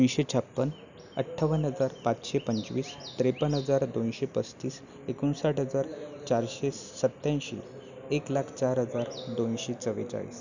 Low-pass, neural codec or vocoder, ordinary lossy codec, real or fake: 7.2 kHz; none; none; real